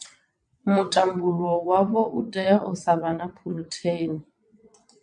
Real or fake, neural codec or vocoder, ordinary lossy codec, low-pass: fake; vocoder, 22.05 kHz, 80 mel bands, WaveNeXt; MP3, 64 kbps; 9.9 kHz